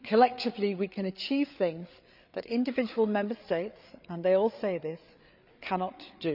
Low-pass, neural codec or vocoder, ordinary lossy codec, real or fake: 5.4 kHz; codec, 16 kHz, 8 kbps, FreqCodec, larger model; none; fake